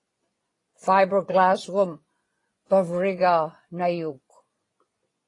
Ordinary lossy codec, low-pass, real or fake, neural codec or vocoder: AAC, 32 kbps; 10.8 kHz; fake; vocoder, 24 kHz, 100 mel bands, Vocos